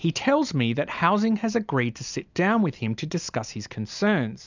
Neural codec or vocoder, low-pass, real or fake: none; 7.2 kHz; real